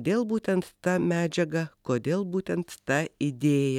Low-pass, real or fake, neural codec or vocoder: 19.8 kHz; real; none